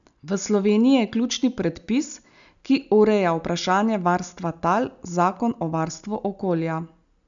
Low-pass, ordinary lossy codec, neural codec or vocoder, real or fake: 7.2 kHz; none; none; real